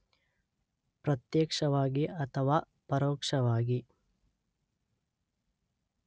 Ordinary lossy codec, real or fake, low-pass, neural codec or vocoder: none; real; none; none